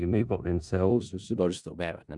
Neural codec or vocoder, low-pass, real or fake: codec, 16 kHz in and 24 kHz out, 0.4 kbps, LongCat-Audio-Codec, four codebook decoder; 10.8 kHz; fake